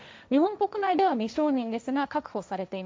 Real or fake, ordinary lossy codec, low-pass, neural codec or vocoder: fake; none; none; codec, 16 kHz, 1.1 kbps, Voila-Tokenizer